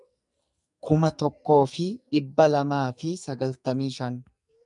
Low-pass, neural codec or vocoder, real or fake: 10.8 kHz; codec, 44.1 kHz, 2.6 kbps, SNAC; fake